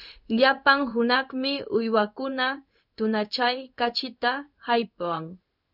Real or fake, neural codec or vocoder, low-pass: fake; codec, 16 kHz in and 24 kHz out, 1 kbps, XY-Tokenizer; 5.4 kHz